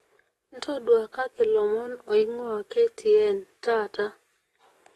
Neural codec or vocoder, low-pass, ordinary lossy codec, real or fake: codec, 44.1 kHz, 7.8 kbps, DAC; 19.8 kHz; AAC, 32 kbps; fake